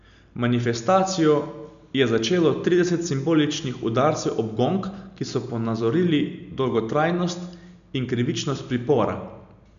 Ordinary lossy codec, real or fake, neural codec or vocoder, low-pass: none; real; none; 7.2 kHz